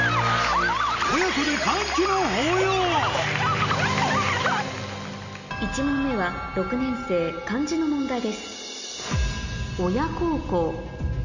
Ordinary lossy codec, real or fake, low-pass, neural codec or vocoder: none; real; 7.2 kHz; none